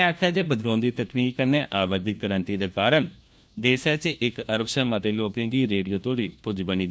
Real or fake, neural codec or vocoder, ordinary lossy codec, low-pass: fake; codec, 16 kHz, 1 kbps, FunCodec, trained on LibriTTS, 50 frames a second; none; none